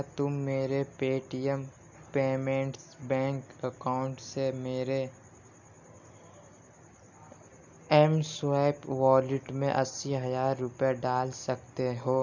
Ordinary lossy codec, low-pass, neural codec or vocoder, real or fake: none; 7.2 kHz; none; real